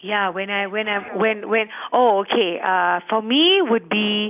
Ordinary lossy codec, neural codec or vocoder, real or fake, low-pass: none; none; real; 3.6 kHz